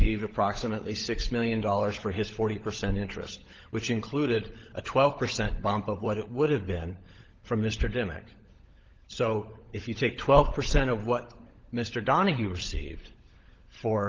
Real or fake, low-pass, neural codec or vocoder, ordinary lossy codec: fake; 7.2 kHz; codec, 16 kHz, 16 kbps, FunCodec, trained on LibriTTS, 50 frames a second; Opus, 16 kbps